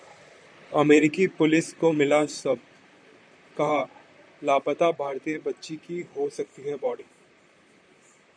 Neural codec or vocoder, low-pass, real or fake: vocoder, 44.1 kHz, 128 mel bands, Pupu-Vocoder; 9.9 kHz; fake